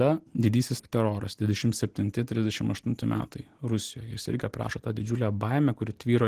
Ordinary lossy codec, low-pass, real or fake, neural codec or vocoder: Opus, 16 kbps; 14.4 kHz; real; none